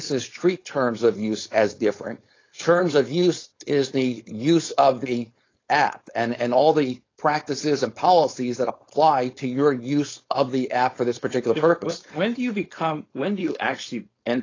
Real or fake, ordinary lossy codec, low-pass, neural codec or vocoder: fake; AAC, 32 kbps; 7.2 kHz; codec, 16 kHz, 4.8 kbps, FACodec